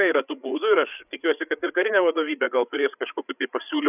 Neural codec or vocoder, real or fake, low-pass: codec, 16 kHz, 8 kbps, FreqCodec, larger model; fake; 3.6 kHz